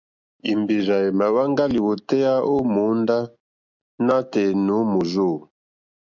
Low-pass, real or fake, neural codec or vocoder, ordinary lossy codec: 7.2 kHz; real; none; MP3, 64 kbps